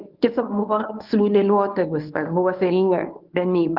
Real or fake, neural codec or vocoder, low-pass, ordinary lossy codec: fake; codec, 24 kHz, 0.9 kbps, WavTokenizer, medium speech release version 1; 5.4 kHz; Opus, 32 kbps